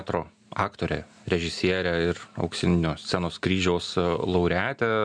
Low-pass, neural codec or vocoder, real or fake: 9.9 kHz; none; real